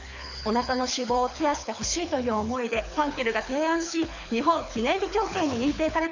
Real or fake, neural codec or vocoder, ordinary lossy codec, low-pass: fake; codec, 24 kHz, 6 kbps, HILCodec; none; 7.2 kHz